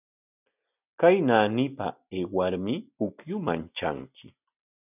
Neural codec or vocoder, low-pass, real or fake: none; 3.6 kHz; real